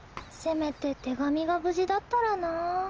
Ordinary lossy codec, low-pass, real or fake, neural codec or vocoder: Opus, 24 kbps; 7.2 kHz; real; none